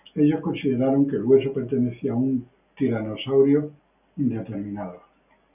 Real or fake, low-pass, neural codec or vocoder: real; 3.6 kHz; none